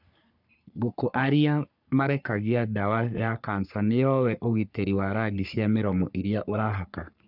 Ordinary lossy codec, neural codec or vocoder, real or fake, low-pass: none; codec, 44.1 kHz, 3.4 kbps, Pupu-Codec; fake; 5.4 kHz